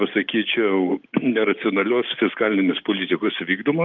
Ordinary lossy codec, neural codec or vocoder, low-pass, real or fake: Opus, 32 kbps; none; 7.2 kHz; real